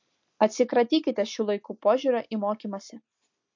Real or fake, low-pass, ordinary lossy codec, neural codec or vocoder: real; 7.2 kHz; MP3, 48 kbps; none